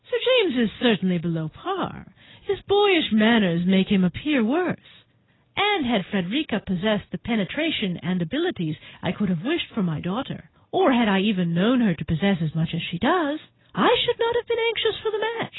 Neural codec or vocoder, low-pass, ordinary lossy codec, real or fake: none; 7.2 kHz; AAC, 16 kbps; real